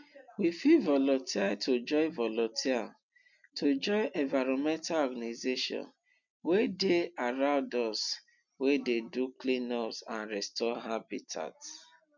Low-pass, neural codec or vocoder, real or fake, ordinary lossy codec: 7.2 kHz; none; real; none